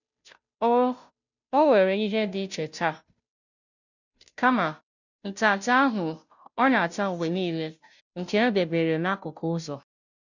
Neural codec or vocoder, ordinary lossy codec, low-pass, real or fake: codec, 16 kHz, 0.5 kbps, FunCodec, trained on Chinese and English, 25 frames a second; none; 7.2 kHz; fake